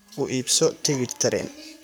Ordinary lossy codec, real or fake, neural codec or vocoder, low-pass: none; fake; codec, 44.1 kHz, 7.8 kbps, Pupu-Codec; none